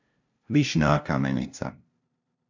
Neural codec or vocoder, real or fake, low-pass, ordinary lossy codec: codec, 16 kHz, 0.5 kbps, FunCodec, trained on LibriTTS, 25 frames a second; fake; 7.2 kHz; MP3, 64 kbps